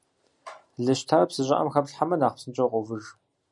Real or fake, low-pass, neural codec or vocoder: real; 10.8 kHz; none